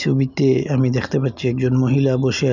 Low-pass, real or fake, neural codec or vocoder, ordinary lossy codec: 7.2 kHz; real; none; none